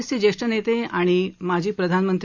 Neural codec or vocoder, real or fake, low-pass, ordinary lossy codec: none; real; 7.2 kHz; none